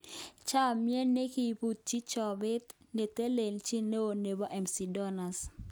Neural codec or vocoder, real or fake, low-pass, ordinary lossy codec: none; real; none; none